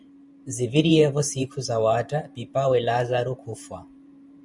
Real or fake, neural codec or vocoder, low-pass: fake; vocoder, 44.1 kHz, 128 mel bands every 512 samples, BigVGAN v2; 10.8 kHz